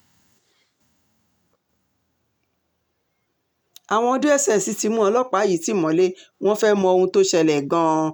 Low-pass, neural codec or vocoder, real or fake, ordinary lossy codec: none; none; real; none